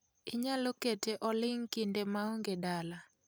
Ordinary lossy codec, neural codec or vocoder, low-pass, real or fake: none; none; none; real